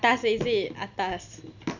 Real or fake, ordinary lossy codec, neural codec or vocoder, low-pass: real; none; none; 7.2 kHz